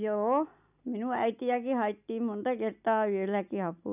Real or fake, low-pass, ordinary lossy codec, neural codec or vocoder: real; 3.6 kHz; none; none